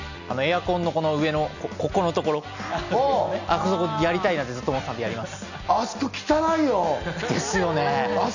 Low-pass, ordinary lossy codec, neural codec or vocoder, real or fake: 7.2 kHz; none; none; real